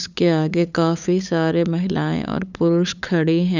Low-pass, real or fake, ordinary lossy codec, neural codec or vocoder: 7.2 kHz; fake; none; codec, 16 kHz, 8 kbps, FunCodec, trained on LibriTTS, 25 frames a second